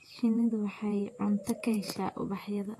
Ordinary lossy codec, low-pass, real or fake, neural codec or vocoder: AAC, 48 kbps; 14.4 kHz; fake; vocoder, 48 kHz, 128 mel bands, Vocos